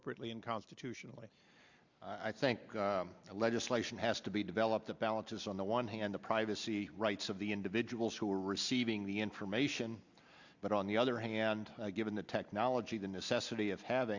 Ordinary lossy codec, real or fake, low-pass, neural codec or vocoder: Opus, 64 kbps; real; 7.2 kHz; none